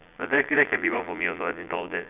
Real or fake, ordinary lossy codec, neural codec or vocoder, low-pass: fake; none; vocoder, 22.05 kHz, 80 mel bands, Vocos; 3.6 kHz